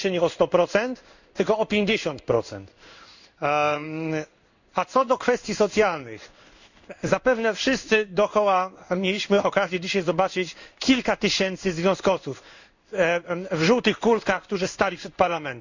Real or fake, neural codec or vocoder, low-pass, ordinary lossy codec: fake; codec, 16 kHz in and 24 kHz out, 1 kbps, XY-Tokenizer; 7.2 kHz; none